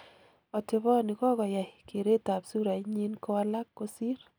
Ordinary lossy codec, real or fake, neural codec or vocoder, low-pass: none; real; none; none